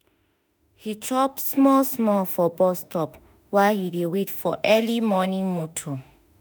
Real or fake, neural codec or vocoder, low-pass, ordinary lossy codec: fake; autoencoder, 48 kHz, 32 numbers a frame, DAC-VAE, trained on Japanese speech; none; none